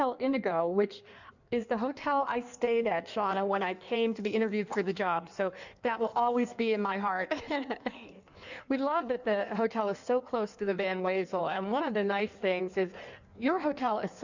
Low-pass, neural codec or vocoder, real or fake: 7.2 kHz; codec, 16 kHz in and 24 kHz out, 1.1 kbps, FireRedTTS-2 codec; fake